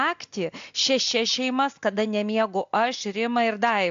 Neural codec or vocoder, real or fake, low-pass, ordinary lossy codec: none; real; 7.2 kHz; AAC, 64 kbps